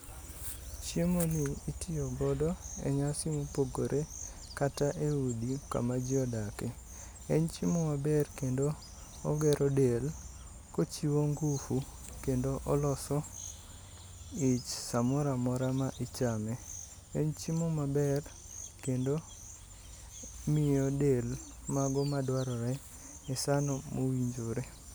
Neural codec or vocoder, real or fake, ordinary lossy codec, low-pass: none; real; none; none